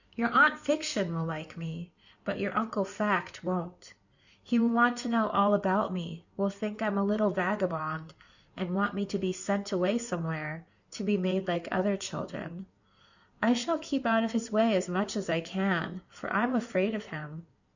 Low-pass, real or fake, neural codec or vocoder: 7.2 kHz; fake; codec, 16 kHz in and 24 kHz out, 2.2 kbps, FireRedTTS-2 codec